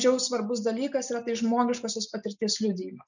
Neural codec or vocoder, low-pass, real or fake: none; 7.2 kHz; real